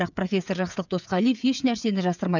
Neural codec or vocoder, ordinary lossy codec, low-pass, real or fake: codec, 16 kHz, 16 kbps, FreqCodec, smaller model; none; 7.2 kHz; fake